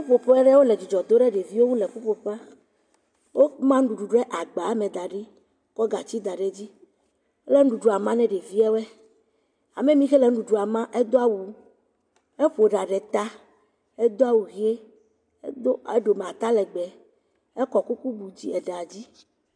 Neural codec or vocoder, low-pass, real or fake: none; 9.9 kHz; real